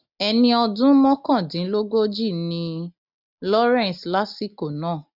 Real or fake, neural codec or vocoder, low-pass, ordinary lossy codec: real; none; 5.4 kHz; none